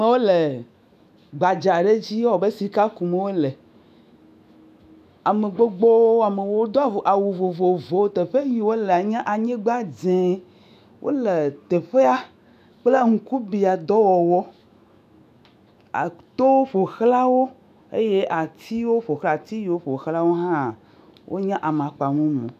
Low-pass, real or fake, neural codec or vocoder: 14.4 kHz; fake; autoencoder, 48 kHz, 128 numbers a frame, DAC-VAE, trained on Japanese speech